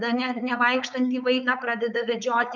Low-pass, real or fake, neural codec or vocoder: 7.2 kHz; fake; codec, 16 kHz, 4.8 kbps, FACodec